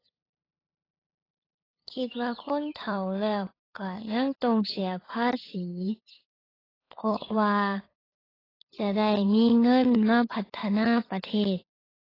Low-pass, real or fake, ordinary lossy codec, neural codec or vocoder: 5.4 kHz; fake; AAC, 24 kbps; codec, 16 kHz, 8 kbps, FunCodec, trained on LibriTTS, 25 frames a second